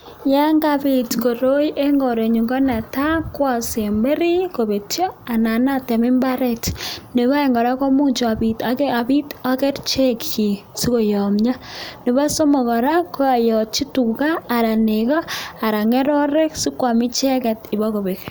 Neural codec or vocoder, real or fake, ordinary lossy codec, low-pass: none; real; none; none